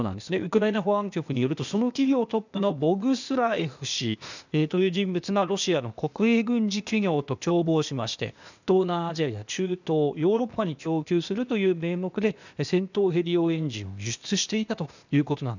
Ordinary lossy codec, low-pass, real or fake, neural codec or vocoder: none; 7.2 kHz; fake; codec, 16 kHz, 0.8 kbps, ZipCodec